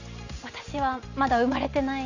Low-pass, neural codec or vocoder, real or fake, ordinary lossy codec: 7.2 kHz; none; real; none